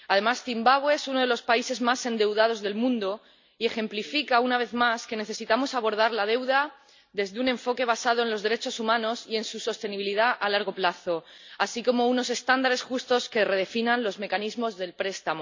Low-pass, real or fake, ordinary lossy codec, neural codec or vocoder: 7.2 kHz; real; MP3, 64 kbps; none